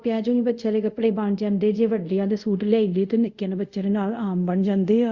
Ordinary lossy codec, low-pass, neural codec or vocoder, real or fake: Opus, 64 kbps; 7.2 kHz; codec, 24 kHz, 0.5 kbps, DualCodec; fake